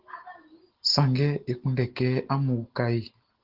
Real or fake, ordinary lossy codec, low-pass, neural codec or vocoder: real; Opus, 16 kbps; 5.4 kHz; none